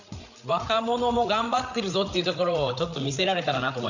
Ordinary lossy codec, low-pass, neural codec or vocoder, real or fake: none; 7.2 kHz; codec, 16 kHz, 8 kbps, FreqCodec, larger model; fake